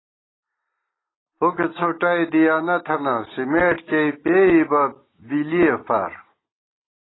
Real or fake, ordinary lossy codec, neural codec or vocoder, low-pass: real; AAC, 16 kbps; none; 7.2 kHz